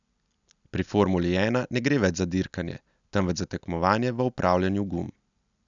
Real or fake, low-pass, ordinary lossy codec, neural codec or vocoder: real; 7.2 kHz; none; none